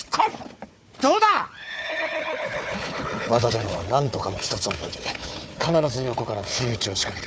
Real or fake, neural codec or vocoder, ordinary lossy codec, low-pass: fake; codec, 16 kHz, 4 kbps, FunCodec, trained on Chinese and English, 50 frames a second; none; none